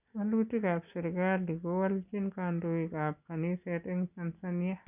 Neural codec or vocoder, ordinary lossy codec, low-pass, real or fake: none; none; 3.6 kHz; real